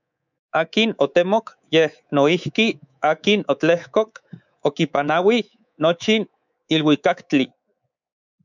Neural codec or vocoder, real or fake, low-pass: codec, 24 kHz, 3.1 kbps, DualCodec; fake; 7.2 kHz